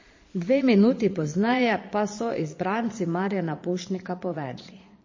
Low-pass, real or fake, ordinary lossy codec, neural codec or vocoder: 7.2 kHz; fake; MP3, 32 kbps; vocoder, 22.05 kHz, 80 mel bands, Vocos